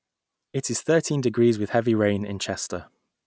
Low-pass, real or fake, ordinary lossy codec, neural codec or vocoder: none; real; none; none